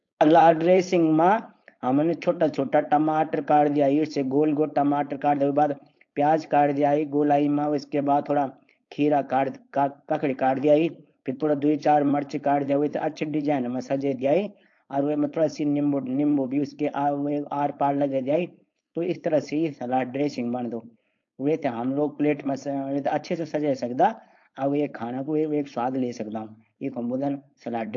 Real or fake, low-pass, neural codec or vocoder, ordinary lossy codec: fake; 7.2 kHz; codec, 16 kHz, 4.8 kbps, FACodec; AAC, 48 kbps